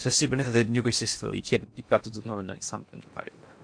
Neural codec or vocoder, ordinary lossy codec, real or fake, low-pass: codec, 16 kHz in and 24 kHz out, 0.6 kbps, FocalCodec, streaming, 4096 codes; AAC, 64 kbps; fake; 9.9 kHz